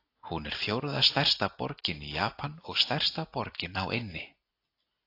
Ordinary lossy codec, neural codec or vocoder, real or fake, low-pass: AAC, 32 kbps; none; real; 5.4 kHz